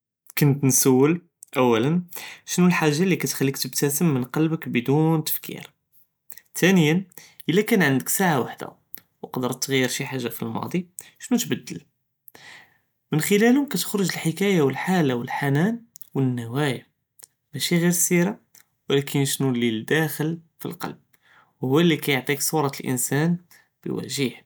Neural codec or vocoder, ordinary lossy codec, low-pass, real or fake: none; none; none; real